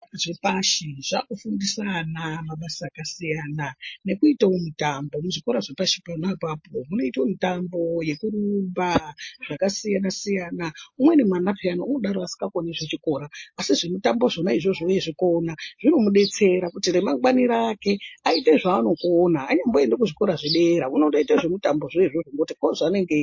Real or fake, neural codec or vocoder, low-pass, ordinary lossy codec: real; none; 7.2 kHz; MP3, 32 kbps